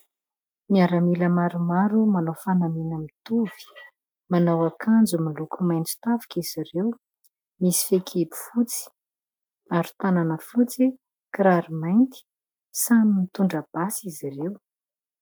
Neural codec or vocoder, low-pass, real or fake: none; 19.8 kHz; real